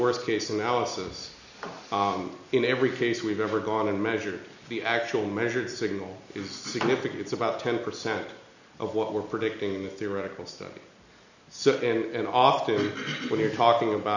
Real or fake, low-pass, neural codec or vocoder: real; 7.2 kHz; none